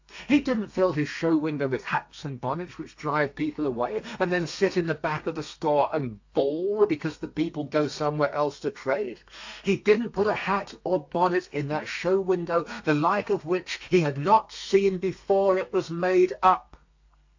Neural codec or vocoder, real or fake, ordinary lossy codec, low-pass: codec, 32 kHz, 1.9 kbps, SNAC; fake; AAC, 48 kbps; 7.2 kHz